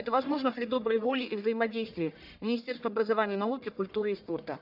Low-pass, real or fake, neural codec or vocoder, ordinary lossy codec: 5.4 kHz; fake; codec, 44.1 kHz, 1.7 kbps, Pupu-Codec; none